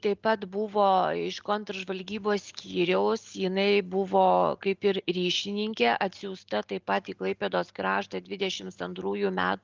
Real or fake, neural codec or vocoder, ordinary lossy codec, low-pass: real; none; Opus, 32 kbps; 7.2 kHz